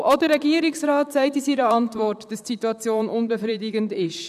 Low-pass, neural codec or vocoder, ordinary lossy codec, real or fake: 14.4 kHz; vocoder, 44.1 kHz, 128 mel bands, Pupu-Vocoder; none; fake